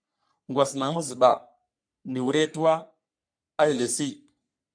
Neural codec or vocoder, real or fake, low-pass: codec, 44.1 kHz, 3.4 kbps, Pupu-Codec; fake; 9.9 kHz